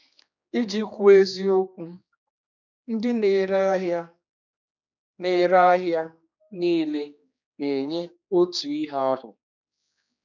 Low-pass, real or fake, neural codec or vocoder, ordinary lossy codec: 7.2 kHz; fake; codec, 16 kHz, 2 kbps, X-Codec, HuBERT features, trained on general audio; none